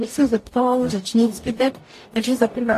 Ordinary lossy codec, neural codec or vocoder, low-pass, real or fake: AAC, 48 kbps; codec, 44.1 kHz, 0.9 kbps, DAC; 14.4 kHz; fake